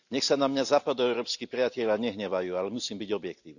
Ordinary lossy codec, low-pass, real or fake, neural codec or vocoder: none; 7.2 kHz; real; none